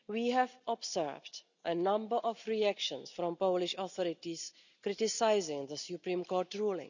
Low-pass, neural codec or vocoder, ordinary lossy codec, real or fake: 7.2 kHz; none; none; real